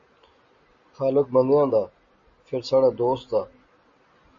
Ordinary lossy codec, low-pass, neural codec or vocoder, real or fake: MP3, 32 kbps; 7.2 kHz; none; real